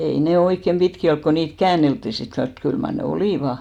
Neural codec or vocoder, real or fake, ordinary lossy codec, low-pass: none; real; none; 19.8 kHz